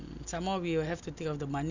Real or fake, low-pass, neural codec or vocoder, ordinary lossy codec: real; 7.2 kHz; none; Opus, 64 kbps